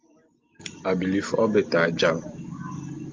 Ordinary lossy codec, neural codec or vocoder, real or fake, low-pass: Opus, 32 kbps; none; real; 7.2 kHz